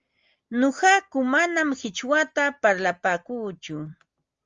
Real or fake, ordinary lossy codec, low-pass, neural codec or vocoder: real; Opus, 32 kbps; 7.2 kHz; none